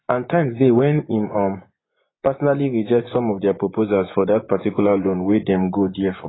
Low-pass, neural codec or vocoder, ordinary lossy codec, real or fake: 7.2 kHz; vocoder, 24 kHz, 100 mel bands, Vocos; AAC, 16 kbps; fake